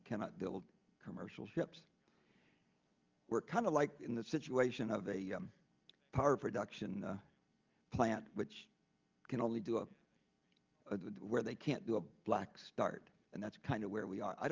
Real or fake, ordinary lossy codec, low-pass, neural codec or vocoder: real; Opus, 32 kbps; 7.2 kHz; none